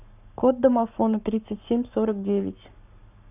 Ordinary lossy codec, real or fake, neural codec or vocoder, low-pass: none; fake; codec, 44.1 kHz, 7.8 kbps, Pupu-Codec; 3.6 kHz